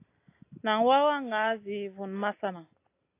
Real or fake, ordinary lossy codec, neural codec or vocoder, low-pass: real; AAC, 24 kbps; none; 3.6 kHz